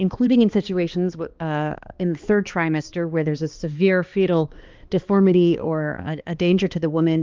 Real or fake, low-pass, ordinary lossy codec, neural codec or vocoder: fake; 7.2 kHz; Opus, 32 kbps; codec, 16 kHz, 2 kbps, X-Codec, HuBERT features, trained on balanced general audio